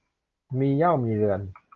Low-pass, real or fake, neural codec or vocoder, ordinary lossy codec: 7.2 kHz; real; none; Opus, 32 kbps